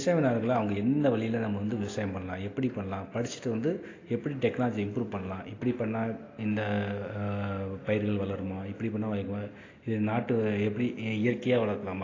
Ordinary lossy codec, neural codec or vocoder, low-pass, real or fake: AAC, 32 kbps; none; 7.2 kHz; real